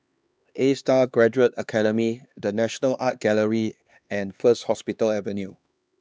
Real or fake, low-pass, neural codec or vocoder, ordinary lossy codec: fake; none; codec, 16 kHz, 2 kbps, X-Codec, HuBERT features, trained on LibriSpeech; none